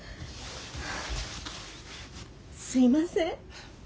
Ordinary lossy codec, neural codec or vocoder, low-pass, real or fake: none; none; none; real